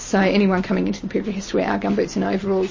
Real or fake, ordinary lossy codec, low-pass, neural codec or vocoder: real; MP3, 32 kbps; 7.2 kHz; none